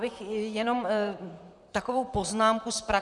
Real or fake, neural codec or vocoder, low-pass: fake; vocoder, 44.1 kHz, 128 mel bands, Pupu-Vocoder; 10.8 kHz